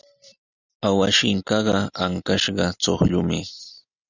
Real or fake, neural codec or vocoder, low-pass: real; none; 7.2 kHz